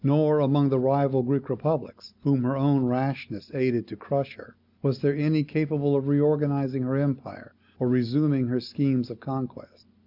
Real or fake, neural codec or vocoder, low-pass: real; none; 5.4 kHz